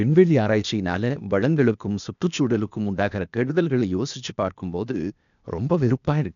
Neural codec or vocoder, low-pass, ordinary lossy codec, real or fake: codec, 16 kHz, 0.8 kbps, ZipCodec; 7.2 kHz; none; fake